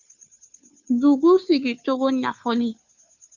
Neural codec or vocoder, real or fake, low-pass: codec, 16 kHz, 2 kbps, FunCodec, trained on Chinese and English, 25 frames a second; fake; 7.2 kHz